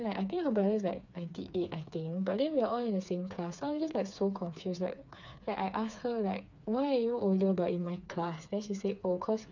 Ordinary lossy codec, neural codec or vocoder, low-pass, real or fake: none; codec, 16 kHz, 4 kbps, FreqCodec, smaller model; 7.2 kHz; fake